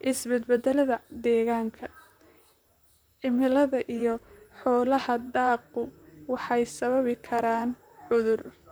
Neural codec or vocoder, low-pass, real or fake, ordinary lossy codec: vocoder, 44.1 kHz, 128 mel bands, Pupu-Vocoder; none; fake; none